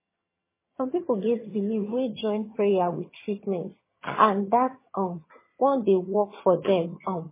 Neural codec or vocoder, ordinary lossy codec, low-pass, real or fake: vocoder, 22.05 kHz, 80 mel bands, HiFi-GAN; MP3, 16 kbps; 3.6 kHz; fake